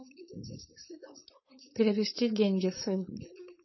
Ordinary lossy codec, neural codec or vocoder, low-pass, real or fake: MP3, 24 kbps; codec, 16 kHz, 4.8 kbps, FACodec; 7.2 kHz; fake